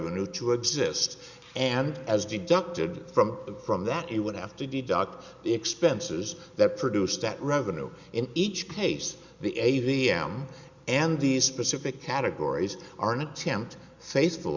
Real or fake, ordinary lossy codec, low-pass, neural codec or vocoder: real; Opus, 64 kbps; 7.2 kHz; none